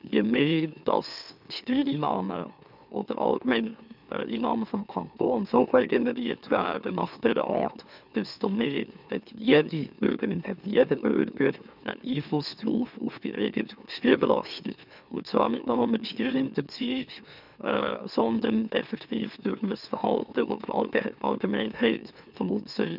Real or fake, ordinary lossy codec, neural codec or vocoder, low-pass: fake; none; autoencoder, 44.1 kHz, a latent of 192 numbers a frame, MeloTTS; 5.4 kHz